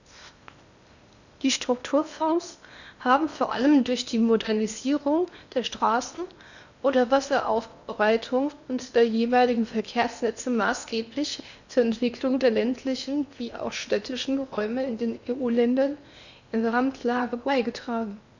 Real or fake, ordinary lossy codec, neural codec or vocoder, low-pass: fake; none; codec, 16 kHz in and 24 kHz out, 0.8 kbps, FocalCodec, streaming, 65536 codes; 7.2 kHz